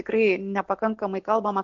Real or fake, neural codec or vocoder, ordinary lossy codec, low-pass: real; none; MP3, 48 kbps; 7.2 kHz